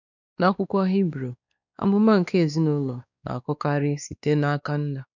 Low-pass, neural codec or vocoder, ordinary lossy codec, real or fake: 7.2 kHz; codec, 16 kHz, 2 kbps, X-Codec, WavLM features, trained on Multilingual LibriSpeech; none; fake